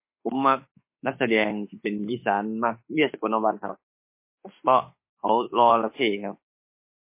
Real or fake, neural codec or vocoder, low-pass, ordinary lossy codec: fake; autoencoder, 48 kHz, 32 numbers a frame, DAC-VAE, trained on Japanese speech; 3.6 kHz; MP3, 24 kbps